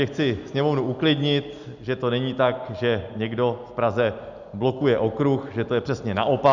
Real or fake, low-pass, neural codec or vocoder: real; 7.2 kHz; none